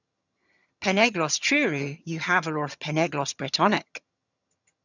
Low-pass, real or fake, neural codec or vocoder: 7.2 kHz; fake; vocoder, 22.05 kHz, 80 mel bands, HiFi-GAN